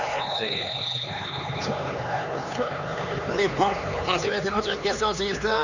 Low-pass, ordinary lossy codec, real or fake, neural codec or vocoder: 7.2 kHz; none; fake; codec, 16 kHz, 4 kbps, X-Codec, HuBERT features, trained on LibriSpeech